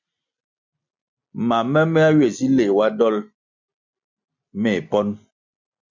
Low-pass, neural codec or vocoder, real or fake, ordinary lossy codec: 7.2 kHz; none; real; MP3, 64 kbps